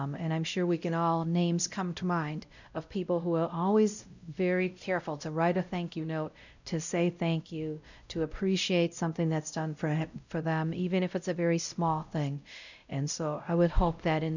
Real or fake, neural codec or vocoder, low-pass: fake; codec, 16 kHz, 0.5 kbps, X-Codec, WavLM features, trained on Multilingual LibriSpeech; 7.2 kHz